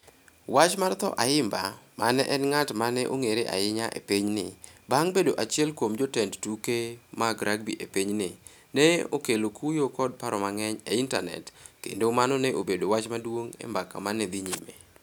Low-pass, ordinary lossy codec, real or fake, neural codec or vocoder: none; none; real; none